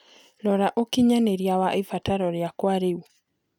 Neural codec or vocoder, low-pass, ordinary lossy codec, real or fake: none; 19.8 kHz; none; real